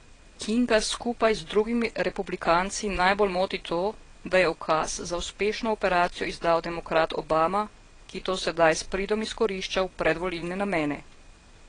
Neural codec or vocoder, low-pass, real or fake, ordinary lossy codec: vocoder, 22.05 kHz, 80 mel bands, WaveNeXt; 9.9 kHz; fake; AAC, 32 kbps